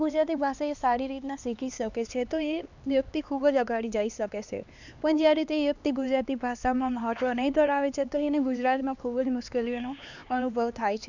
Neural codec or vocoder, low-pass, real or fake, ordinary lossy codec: codec, 16 kHz, 2 kbps, X-Codec, HuBERT features, trained on LibriSpeech; 7.2 kHz; fake; none